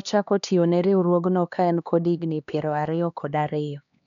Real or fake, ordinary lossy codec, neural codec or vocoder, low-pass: fake; none; codec, 16 kHz, 2 kbps, X-Codec, HuBERT features, trained on LibriSpeech; 7.2 kHz